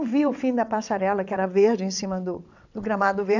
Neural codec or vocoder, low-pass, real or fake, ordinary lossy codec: vocoder, 22.05 kHz, 80 mel bands, WaveNeXt; 7.2 kHz; fake; none